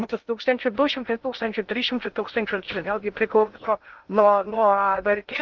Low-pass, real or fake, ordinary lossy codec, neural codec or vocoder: 7.2 kHz; fake; Opus, 32 kbps; codec, 16 kHz in and 24 kHz out, 0.6 kbps, FocalCodec, streaming, 2048 codes